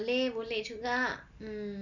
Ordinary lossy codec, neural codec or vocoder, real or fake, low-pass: none; none; real; 7.2 kHz